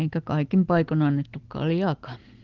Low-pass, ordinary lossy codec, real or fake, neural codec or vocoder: 7.2 kHz; Opus, 16 kbps; real; none